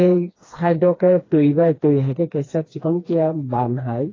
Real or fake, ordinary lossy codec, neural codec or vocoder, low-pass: fake; AAC, 32 kbps; codec, 16 kHz, 2 kbps, FreqCodec, smaller model; 7.2 kHz